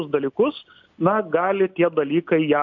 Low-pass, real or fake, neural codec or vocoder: 7.2 kHz; real; none